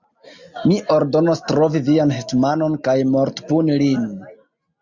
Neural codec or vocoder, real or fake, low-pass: none; real; 7.2 kHz